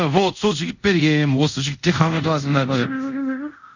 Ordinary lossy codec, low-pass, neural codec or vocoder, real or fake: none; 7.2 kHz; codec, 24 kHz, 0.5 kbps, DualCodec; fake